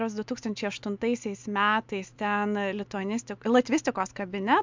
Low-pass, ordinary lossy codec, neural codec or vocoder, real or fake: 7.2 kHz; MP3, 64 kbps; none; real